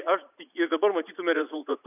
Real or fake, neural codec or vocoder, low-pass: fake; vocoder, 44.1 kHz, 80 mel bands, Vocos; 3.6 kHz